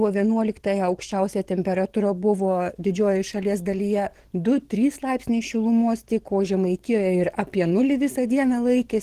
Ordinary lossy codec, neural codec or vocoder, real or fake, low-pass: Opus, 16 kbps; codec, 44.1 kHz, 7.8 kbps, DAC; fake; 14.4 kHz